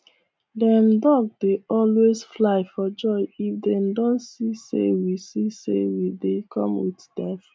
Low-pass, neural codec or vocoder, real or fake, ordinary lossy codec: none; none; real; none